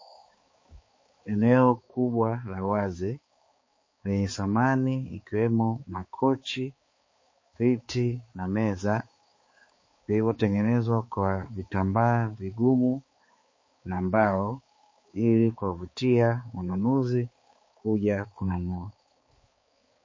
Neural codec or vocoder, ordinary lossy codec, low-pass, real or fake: codec, 16 kHz, 4 kbps, X-Codec, HuBERT features, trained on balanced general audio; MP3, 32 kbps; 7.2 kHz; fake